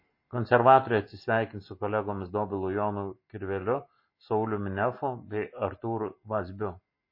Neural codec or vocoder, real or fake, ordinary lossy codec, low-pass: none; real; MP3, 24 kbps; 5.4 kHz